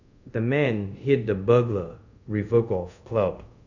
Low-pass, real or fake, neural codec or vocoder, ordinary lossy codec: 7.2 kHz; fake; codec, 24 kHz, 0.5 kbps, DualCodec; none